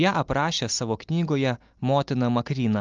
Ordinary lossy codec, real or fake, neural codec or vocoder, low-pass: Opus, 24 kbps; real; none; 7.2 kHz